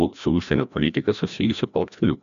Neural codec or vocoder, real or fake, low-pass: codec, 16 kHz, 1 kbps, FreqCodec, larger model; fake; 7.2 kHz